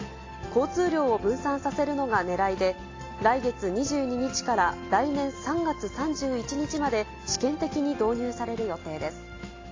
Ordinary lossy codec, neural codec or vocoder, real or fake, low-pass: AAC, 32 kbps; none; real; 7.2 kHz